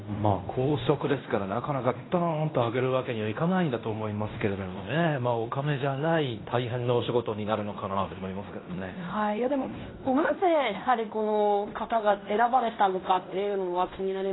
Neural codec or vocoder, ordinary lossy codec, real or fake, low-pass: codec, 16 kHz in and 24 kHz out, 0.9 kbps, LongCat-Audio-Codec, fine tuned four codebook decoder; AAC, 16 kbps; fake; 7.2 kHz